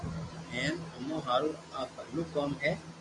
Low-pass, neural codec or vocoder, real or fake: 10.8 kHz; none; real